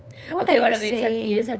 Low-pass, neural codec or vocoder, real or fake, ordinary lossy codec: none; codec, 16 kHz, 8 kbps, FunCodec, trained on LibriTTS, 25 frames a second; fake; none